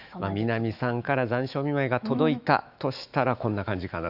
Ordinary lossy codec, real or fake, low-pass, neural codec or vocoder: Opus, 64 kbps; fake; 5.4 kHz; autoencoder, 48 kHz, 128 numbers a frame, DAC-VAE, trained on Japanese speech